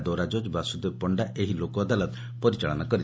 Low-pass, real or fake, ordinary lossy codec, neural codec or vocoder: none; real; none; none